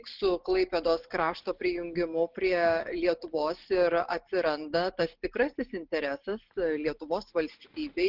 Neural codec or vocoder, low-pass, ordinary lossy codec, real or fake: none; 5.4 kHz; Opus, 16 kbps; real